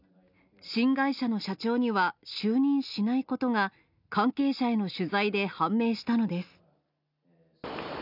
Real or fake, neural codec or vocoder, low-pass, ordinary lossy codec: real; none; 5.4 kHz; none